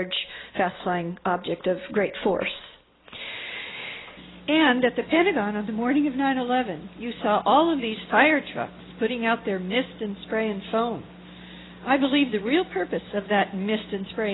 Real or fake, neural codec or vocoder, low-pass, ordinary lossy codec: real; none; 7.2 kHz; AAC, 16 kbps